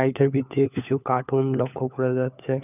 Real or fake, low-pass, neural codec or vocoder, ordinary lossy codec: fake; 3.6 kHz; codec, 16 kHz, 4 kbps, FunCodec, trained on LibriTTS, 50 frames a second; none